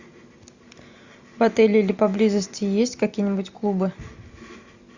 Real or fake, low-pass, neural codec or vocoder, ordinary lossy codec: real; 7.2 kHz; none; Opus, 64 kbps